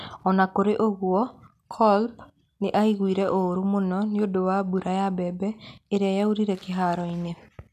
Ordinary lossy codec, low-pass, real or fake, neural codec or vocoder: none; 14.4 kHz; real; none